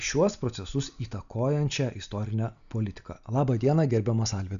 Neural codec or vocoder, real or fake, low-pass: none; real; 7.2 kHz